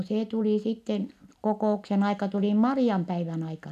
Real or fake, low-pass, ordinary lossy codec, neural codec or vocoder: real; 14.4 kHz; none; none